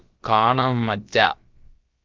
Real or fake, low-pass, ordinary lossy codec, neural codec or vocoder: fake; 7.2 kHz; Opus, 32 kbps; codec, 16 kHz, about 1 kbps, DyCAST, with the encoder's durations